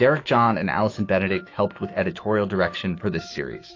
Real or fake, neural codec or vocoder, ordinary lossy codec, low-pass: fake; autoencoder, 48 kHz, 128 numbers a frame, DAC-VAE, trained on Japanese speech; AAC, 32 kbps; 7.2 kHz